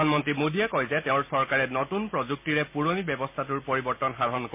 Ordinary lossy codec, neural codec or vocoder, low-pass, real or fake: MP3, 24 kbps; none; 3.6 kHz; real